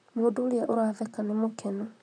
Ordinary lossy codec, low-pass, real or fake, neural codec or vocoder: none; 9.9 kHz; fake; vocoder, 44.1 kHz, 128 mel bands, Pupu-Vocoder